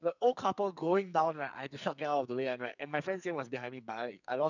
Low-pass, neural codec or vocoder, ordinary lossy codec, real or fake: 7.2 kHz; codec, 44.1 kHz, 2.6 kbps, SNAC; none; fake